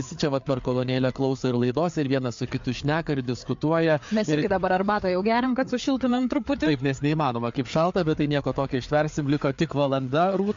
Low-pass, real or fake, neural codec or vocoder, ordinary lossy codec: 7.2 kHz; fake; codec, 16 kHz, 4 kbps, FreqCodec, larger model; MP3, 48 kbps